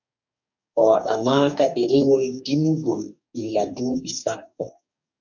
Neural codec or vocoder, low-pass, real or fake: codec, 44.1 kHz, 2.6 kbps, DAC; 7.2 kHz; fake